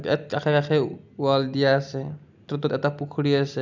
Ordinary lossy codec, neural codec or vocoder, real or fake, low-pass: none; none; real; 7.2 kHz